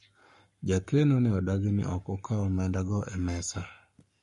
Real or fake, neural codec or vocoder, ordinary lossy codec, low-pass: fake; codec, 44.1 kHz, 7.8 kbps, Pupu-Codec; MP3, 48 kbps; 14.4 kHz